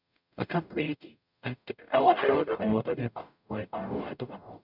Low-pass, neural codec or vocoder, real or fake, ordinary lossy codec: 5.4 kHz; codec, 44.1 kHz, 0.9 kbps, DAC; fake; none